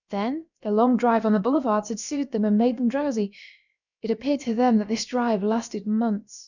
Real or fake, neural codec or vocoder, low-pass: fake; codec, 16 kHz, about 1 kbps, DyCAST, with the encoder's durations; 7.2 kHz